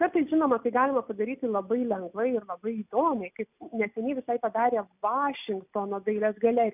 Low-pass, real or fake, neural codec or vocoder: 3.6 kHz; real; none